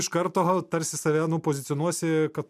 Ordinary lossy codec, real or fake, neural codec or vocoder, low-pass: MP3, 96 kbps; real; none; 14.4 kHz